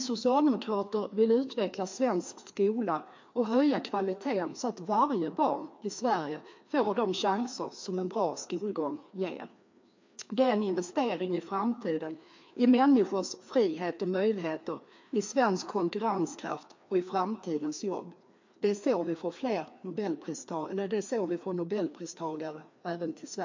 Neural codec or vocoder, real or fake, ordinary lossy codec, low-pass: codec, 16 kHz, 2 kbps, FreqCodec, larger model; fake; MP3, 48 kbps; 7.2 kHz